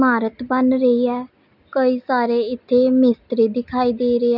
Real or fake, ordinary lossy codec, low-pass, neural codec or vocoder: real; none; 5.4 kHz; none